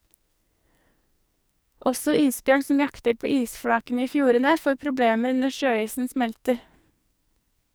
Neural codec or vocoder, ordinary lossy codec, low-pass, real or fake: codec, 44.1 kHz, 2.6 kbps, SNAC; none; none; fake